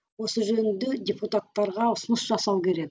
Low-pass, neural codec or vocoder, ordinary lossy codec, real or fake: none; none; none; real